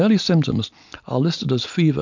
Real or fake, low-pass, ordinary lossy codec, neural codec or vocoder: real; 7.2 kHz; MP3, 64 kbps; none